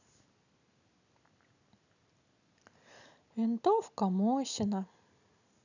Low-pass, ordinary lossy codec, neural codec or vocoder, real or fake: 7.2 kHz; none; none; real